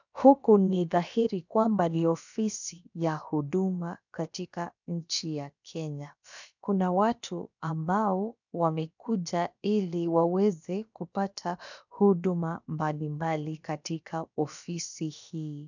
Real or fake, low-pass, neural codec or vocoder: fake; 7.2 kHz; codec, 16 kHz, about 1 kbps, DyCAST, with the encoder's durations